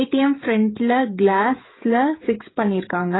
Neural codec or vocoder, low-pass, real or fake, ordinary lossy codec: codec, 16 kHz, 16 kbps, FreqCodec, smaller model; 7.2 kHz; fake; AAC, 16 kbps